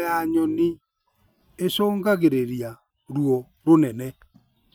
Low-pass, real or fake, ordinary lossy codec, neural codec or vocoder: none; real; none; none